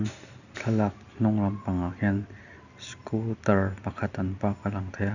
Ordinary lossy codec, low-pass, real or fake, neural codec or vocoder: none; 7.2 kHz; real; none